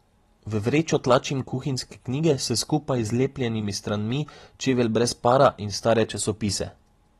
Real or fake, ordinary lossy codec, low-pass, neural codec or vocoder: real; AAC, 32 kbps; 19.8 kHz; none